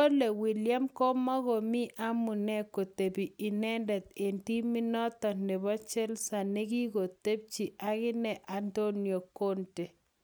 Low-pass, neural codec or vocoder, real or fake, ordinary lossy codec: none; none; real; none